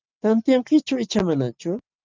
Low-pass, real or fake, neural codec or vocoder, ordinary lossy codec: 7.2 kHz; real; none; Opus, 24 kbps